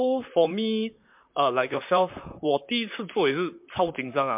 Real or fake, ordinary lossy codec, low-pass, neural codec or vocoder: fake; MP3, 32 kbps; 3.6 kHz; vocoder, 44.1 kHz, 128 mel bands, Pupu-Vocoder